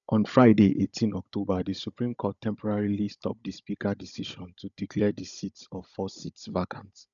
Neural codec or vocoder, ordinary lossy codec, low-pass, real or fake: codec, 16 kHz, 16 kbps, FunCodec, trained on Chinese and English, 50 frames a second; none; 7.2 kHz; fake